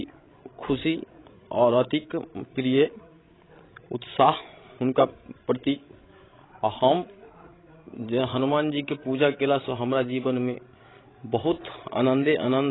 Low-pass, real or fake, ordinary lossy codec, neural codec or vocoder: 7.2 kHz; fake; AAC, 16 kbps; codec, 16 kHz, 16 kbps, FreqCodec, larger model